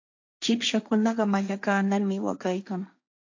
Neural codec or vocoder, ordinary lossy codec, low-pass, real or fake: codec, 16 kHz, 1.1 kbps, Voila-Tokenizer; AAC, 48 kbps; 7.2 kHz; fake